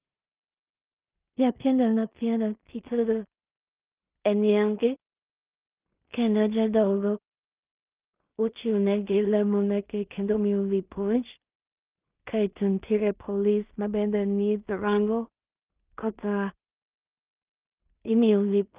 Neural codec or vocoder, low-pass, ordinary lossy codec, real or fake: codec, 16 kHz in and 24 kHz out, 0.4 kbps, LongCat-Audio-Codec, two codebook decoder; 3.6 kHz; Opus, 24 kbps; fake